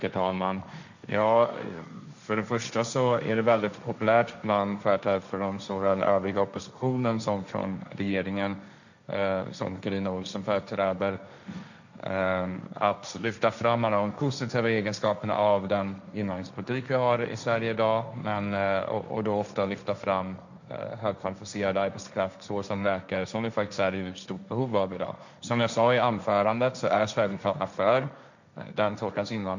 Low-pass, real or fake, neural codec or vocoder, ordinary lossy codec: 7.2 kHz; fake; codec, 16 kHz, 1.1 kbps, Voila-Tokenizer; none